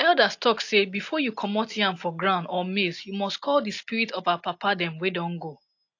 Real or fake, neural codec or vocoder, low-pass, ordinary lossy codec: real; none; 7.2 kHz; AAC, 48 kbps